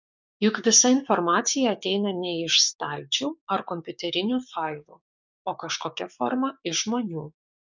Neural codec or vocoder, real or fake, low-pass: codec, 16 kHz, 6 kbps, DAC; fake; 7.2 kHz